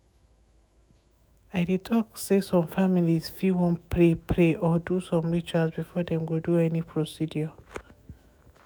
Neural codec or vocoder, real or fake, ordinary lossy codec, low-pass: autoencoder, 48 kHz, 128 numbers a frame, DAC-VAE, trained on Japanese speech; fake; none; none